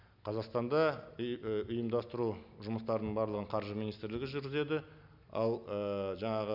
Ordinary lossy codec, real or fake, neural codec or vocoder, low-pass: none; real; none; 5.4 kHz